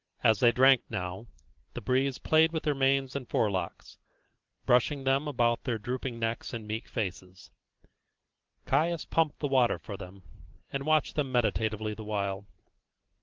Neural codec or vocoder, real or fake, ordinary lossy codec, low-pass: none; real; Opus, 16 kbps; 7.2 kHz